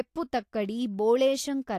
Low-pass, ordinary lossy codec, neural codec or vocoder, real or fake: 14.4 kHz; AAC, 64 kbps; none; real